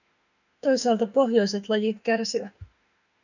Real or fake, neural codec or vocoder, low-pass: fake; autoencoder, 48 kHz, 32 numbers a frame, DAC-VAE, trained on Japanese speech; 7.2 kHz